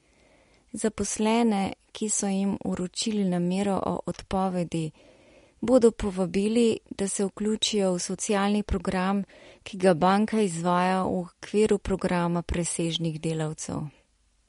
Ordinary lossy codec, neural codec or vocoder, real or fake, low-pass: MP3, 48 kbps; none; real; 10.8 kHz